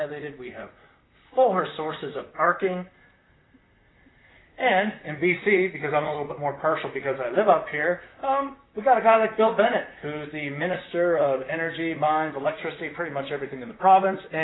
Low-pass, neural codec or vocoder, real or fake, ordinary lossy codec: 7.2 kHz; vocoder, 44.1 kHz, 128 mel bands, Pupu-Vocoder; fake; AAC, 16 kbps